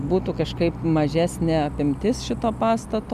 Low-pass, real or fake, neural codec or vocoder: 14.4 kHz; real; none